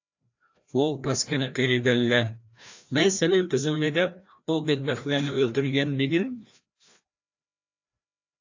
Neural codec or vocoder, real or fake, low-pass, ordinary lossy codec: codec, 16 kHz, 1 kbps, FreqCodec, larger model; fake; 7.2 kHz; AAC, 48 kbps